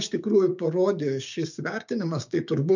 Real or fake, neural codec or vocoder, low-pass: fake; codec, 16 kHz, 6 kbps, DAC; 7.2 kHz